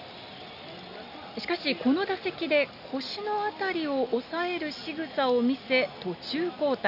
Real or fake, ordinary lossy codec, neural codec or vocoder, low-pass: real; none; none; 5.4 kHz